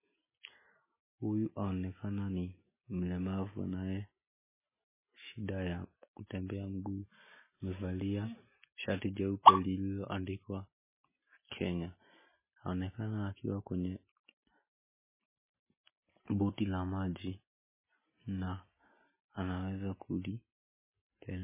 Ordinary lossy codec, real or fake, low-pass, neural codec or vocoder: MP3, 16 kbps; real; 3.6 kHz; none